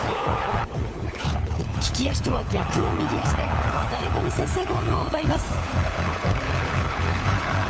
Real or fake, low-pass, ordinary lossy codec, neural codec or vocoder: fake; none; none; codec, 16 kHz, 4 kbps, FunCodec, trained on LibriTTS, 50 frames a second